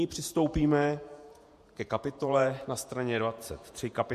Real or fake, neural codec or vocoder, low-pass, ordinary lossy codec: fake; vocoder, 48 kHz, 128 mel bands, Vocos; 14.4 kHz; MP3, 64 kbps